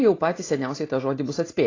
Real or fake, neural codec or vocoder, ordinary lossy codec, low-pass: real; none; AAC, 32 kbps; 7.2 kHz